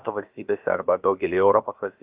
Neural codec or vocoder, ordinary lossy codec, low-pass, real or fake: codec, 16 kHz, about 1 kbps, DyCAST, with the encoder's durations; Opus, 24 kbps; 3.6 kHz; fake